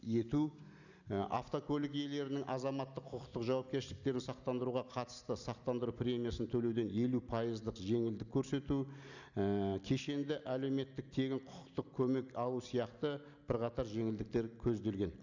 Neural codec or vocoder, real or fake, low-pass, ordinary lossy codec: none; real; 7.2 kHz; none